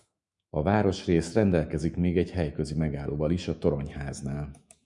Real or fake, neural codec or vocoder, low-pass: fake; autoencoder, 48 kHz, 128 numbers a frame, DAC-VAE, trained on Japanese speech; 10.8 kHz